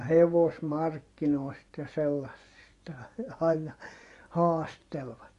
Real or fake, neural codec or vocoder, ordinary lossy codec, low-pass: fake; vocoder, 24 kHz, 100 mel bands, Vocos; AAC, 48 kbps; 10.8 kHz